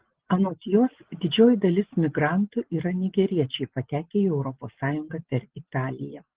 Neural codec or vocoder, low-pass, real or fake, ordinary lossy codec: none; 3.6 kHz; real; Opus, 16 kbps